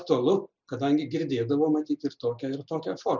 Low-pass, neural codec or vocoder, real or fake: 7.2 kHz; none; real